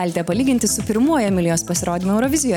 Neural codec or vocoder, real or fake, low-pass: none; real; 19.8 kHz